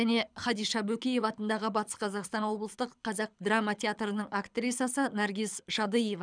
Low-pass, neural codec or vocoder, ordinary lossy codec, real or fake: 9.9 kHz; codec, 24 kHz, 6 kbps, HILCodec; none; fake